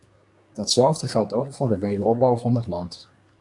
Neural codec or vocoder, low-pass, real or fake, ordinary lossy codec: codec, 24 kHz, 1 kbps, SNAC; 10.8 kHz; fake; MP3, 96 kbps